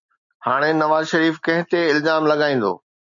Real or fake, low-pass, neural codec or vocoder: real; 7.2 kHz; none